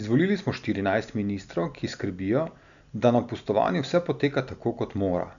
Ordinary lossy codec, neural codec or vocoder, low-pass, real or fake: MP3, 96 kbps; none; 7.2 kHz; real